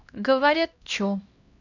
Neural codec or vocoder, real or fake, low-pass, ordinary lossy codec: codec, 16 kHz, 1 kbps, X-Codec, HuBERT features, trained on LibriSpeech; fake; 7.2 kHz; none